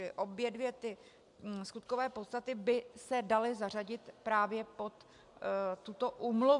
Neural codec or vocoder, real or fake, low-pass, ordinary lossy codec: none; real; 10.8 kHz; AAC, 64 kbps